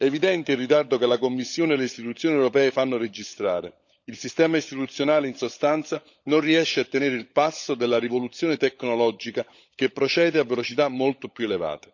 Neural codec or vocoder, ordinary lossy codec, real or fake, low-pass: codec, 16 kHz, 16 kbps, FunCodec, trained on LibriTTS, 50 frames a second; none; fake; 7.2 kHz